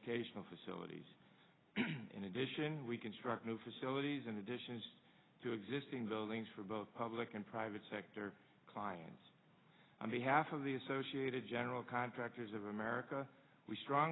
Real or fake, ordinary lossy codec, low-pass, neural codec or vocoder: real; AAC, 16 kbps; 7.2 kHz; none